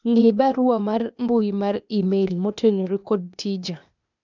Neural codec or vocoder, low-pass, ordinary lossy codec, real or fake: codec, 16 kHz, 0.8 kbps, ZipCodec; 7.2 kHz; none; fake